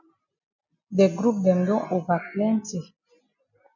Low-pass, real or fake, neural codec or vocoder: 7.2 kHz; real; none